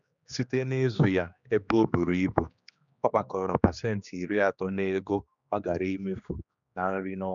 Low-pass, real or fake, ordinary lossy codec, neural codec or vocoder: 7.2 kHz; fake; none; codec, 16 kHz, 2 kbps, X-Codec, HuBERT features, trained on general audio